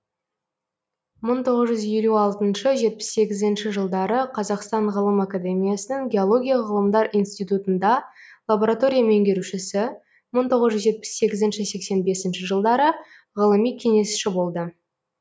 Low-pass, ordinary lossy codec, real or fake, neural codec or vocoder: none; none; real; none